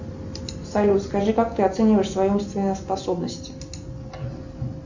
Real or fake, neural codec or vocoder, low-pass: real; none; 7.2 kHz